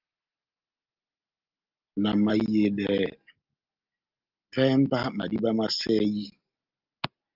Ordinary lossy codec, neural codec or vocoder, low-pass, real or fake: Opus, 24 kbps; none; 5.4 kHz; real